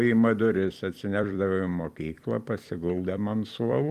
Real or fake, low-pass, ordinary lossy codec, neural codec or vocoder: fake; 14.4 kHz; Opus, 32 kbps; vocoder, 44.1 kHz, 128 mel bands every 256 samples, BigVGAN v2